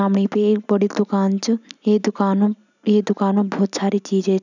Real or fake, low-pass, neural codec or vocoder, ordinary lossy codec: real; 7.2 kHz; none; none